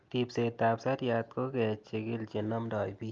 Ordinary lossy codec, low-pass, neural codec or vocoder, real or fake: Opus, 32 kbps; 7.2 kHz; none; real